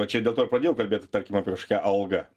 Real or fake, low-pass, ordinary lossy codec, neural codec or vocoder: real; 14.4 kHz; Opus, 24 kbps; none